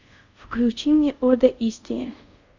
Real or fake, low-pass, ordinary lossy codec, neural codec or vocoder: fake; 7.2 kHz; Opus, 64 kbps; codec, 24 kHz, 0.5 kbps, DualCodec